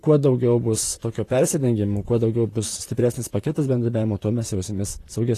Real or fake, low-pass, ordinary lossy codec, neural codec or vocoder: fake; 14.4 kHz; AAC, 48 kbps; vocoder, 44.1 kHz, 128 mel bands, Pupu-Vocoder